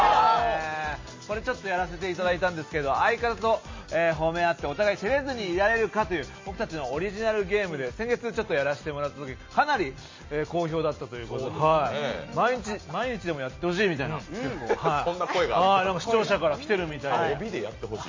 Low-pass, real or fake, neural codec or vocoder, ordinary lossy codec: 7.2 kHz; real; none; MP3, 32 kbps